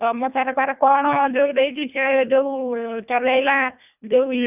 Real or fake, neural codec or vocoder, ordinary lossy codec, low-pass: fake; codec, 24 kHz, 1.5 kbps, HILCodec; none; 3.6 kHz